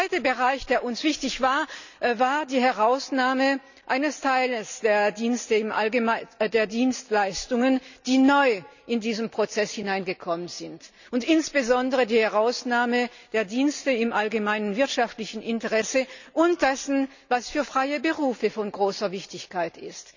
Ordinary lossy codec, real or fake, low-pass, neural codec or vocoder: none; real; 7.2 kHz; none